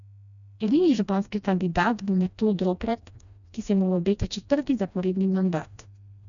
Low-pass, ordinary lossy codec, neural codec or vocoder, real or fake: 7.2 kHz; none; codec, 16 kHz, 1 kbps, FreqCodec, smaller model; fake